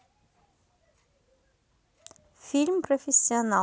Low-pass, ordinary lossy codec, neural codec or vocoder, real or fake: none; none; none; real